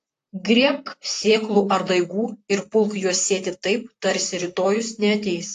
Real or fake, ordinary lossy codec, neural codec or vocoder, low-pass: fake; AAC, 48 kbps; vocoder, 44.1 kHz, 128 mel bands, Pupu-Vocoder; 14.4 kHz